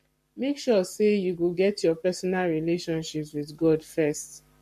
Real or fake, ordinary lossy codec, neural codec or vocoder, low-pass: fake; MP3, 64 kbps; codec, 44.1 kHz, 7.8 kbps, Pupu-Codec; 14.4 kHz